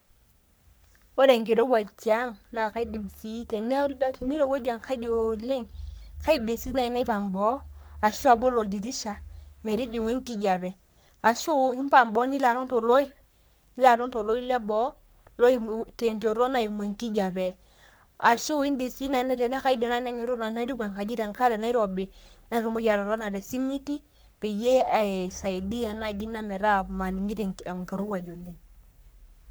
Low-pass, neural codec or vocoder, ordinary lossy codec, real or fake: none; codec, 44.1 kHz, 3.4 kbps, Pupu-Codec; none; fake